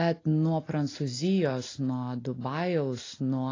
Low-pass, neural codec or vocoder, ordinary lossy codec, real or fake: 7.2 kHz; none; AAC, 32 kbps; real